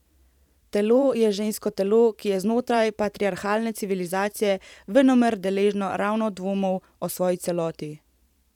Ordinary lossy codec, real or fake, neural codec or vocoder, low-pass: none; fake; vocoder, 44.1 kHz, 128 mel bands every 512 samples, BigVGAN v2; 19.8 kHz